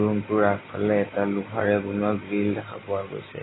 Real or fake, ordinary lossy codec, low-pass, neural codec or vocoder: real; AAC, 16 kbps; 7.2 kHz; none